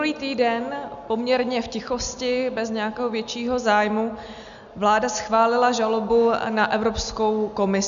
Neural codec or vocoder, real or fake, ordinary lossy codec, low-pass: none; real; MP3, 96 kbps; 7.2 kHz